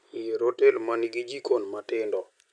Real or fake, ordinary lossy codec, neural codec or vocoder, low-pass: real; MP3, 96 kbps; none; 9.9 kHz